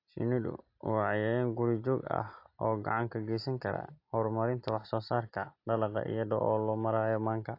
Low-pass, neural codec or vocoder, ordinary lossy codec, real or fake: 5.4 kHz; none; AAC, 32 kbps; real